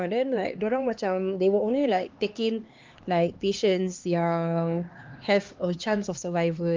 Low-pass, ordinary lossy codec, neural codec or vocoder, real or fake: 7.2 kHz; Opus, 32 kbps; codec, 16 kHz, 2 kbps, X-Codec, HuBERT features, trained on LibriSpeech; fake